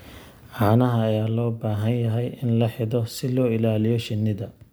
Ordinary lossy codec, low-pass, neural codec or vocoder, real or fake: none; none; none; real